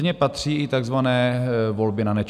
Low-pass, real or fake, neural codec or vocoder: 14.4 kHz; real; none